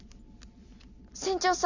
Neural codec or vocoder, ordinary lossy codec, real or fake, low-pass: none; none; real; 7.2 kHz